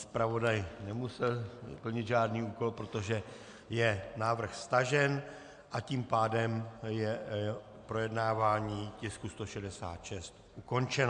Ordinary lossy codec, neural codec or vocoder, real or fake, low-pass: MP3, 64 kbps; none; real; 9.9 kHz